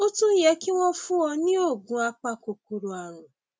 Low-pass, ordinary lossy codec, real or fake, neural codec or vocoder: none; none; real; none